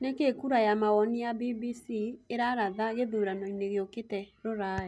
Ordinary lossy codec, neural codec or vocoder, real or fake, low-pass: none; none; real; none